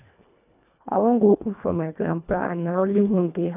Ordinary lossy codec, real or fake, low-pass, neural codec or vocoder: none; fake; 3.6 kHz; codec, 24 kHz, 1.5 kbps, HILCodec